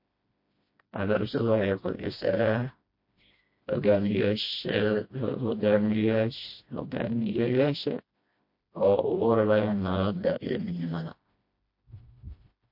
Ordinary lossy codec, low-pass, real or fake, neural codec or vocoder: MP3, 32 kbps; 5.4 kHz; fake; codec, 16 kHz, 1 kbps, FreqCodec, smaller model